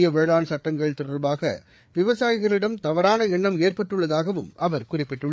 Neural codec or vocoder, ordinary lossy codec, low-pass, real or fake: codec, 16 kHz, 4 kbps, FreqCodec, larger model; none; none; fake